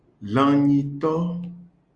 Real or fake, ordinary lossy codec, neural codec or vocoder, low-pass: real; Opus, 64 kbps; none; 9.9 kHz